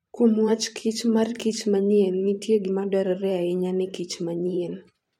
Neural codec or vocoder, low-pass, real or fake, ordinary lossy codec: vocoder, 44.1 kHz, 128 mel bands every 512 samples, BigVGAN v2; 19.8 kHz; fake; MP3, 64 kbps